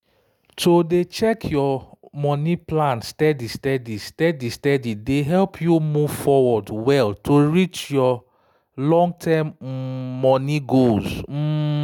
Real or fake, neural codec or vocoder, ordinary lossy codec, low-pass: real; none; none; 19.8 kHz